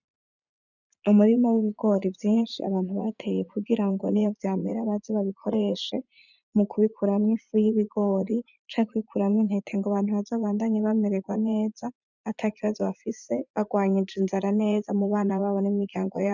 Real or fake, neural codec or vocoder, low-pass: fake; vocoder, 44.1 kHz, 80 mel bands, Vocos; 7.2 kHz